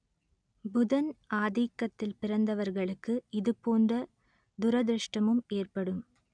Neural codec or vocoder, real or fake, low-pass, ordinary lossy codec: none; real; 9.9 kHz; none